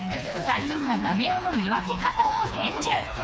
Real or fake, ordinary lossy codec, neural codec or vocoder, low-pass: fake; none; codec, 16 kHz, 2 kbps, FreqCodec, smaller model; none